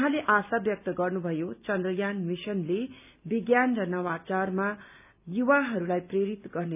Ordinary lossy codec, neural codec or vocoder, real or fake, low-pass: none; none; real; 3.6 kHz